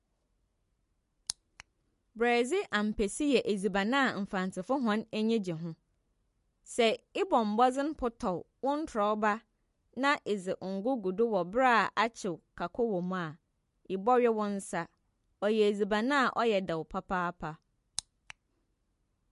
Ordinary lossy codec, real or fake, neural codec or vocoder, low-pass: MP3, 48 kbps; real; none; 14.4 kHz